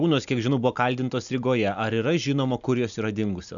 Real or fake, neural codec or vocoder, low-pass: real; none; 7.2 kHz